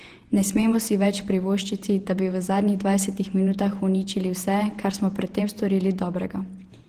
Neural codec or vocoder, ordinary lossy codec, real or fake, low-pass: vocoder, 48 kHz, 128 mel bands, Vocos; Opus, 16 kbps; fake; 14.4 kHz